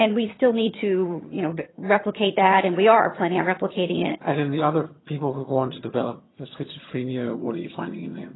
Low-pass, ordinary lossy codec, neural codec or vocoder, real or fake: 7.2 kHz; AAC, 16 kbps; vocoder, 22.05 kHz, 80 mel bands, HiFi-GAN; fake